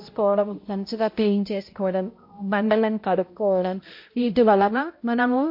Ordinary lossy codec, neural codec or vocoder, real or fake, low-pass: MP3, 32 kbps; codec, 16 kHz, 0.5 kbps, X-Codec, HuBERT features, trained on balanced general audio; fake; 5.4 kHz